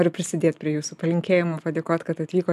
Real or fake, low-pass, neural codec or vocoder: real; 14.4 kHz; none